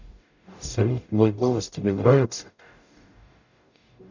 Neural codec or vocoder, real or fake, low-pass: codec, 44.1 kHz, 0.9 kbps, DAC; fake; 7.2 kHz